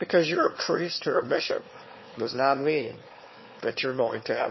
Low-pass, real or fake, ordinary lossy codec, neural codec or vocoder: 7.2 kHz; fake; MP3, 24 kbps; autoencoder, 22.05 kHz, a latent of 192 numbers a frame, VITS, trained on one speaker